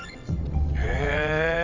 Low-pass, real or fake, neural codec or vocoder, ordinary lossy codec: 7.2 kHz; fake; vocoder, 22.05 kHz, 80 mel bands, WaveNeXt; AAC, 48 kbps